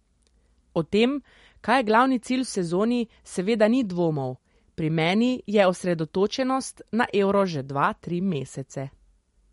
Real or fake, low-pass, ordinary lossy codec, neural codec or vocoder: real; 19.8 kHz; MP3, 48 kbps; none